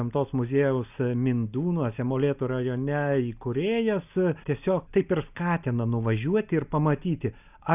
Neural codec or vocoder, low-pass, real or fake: none; 3.6 kHz; real